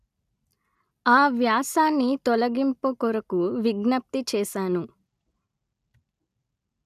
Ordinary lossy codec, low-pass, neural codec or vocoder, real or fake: none; 14.4 kHz; none; real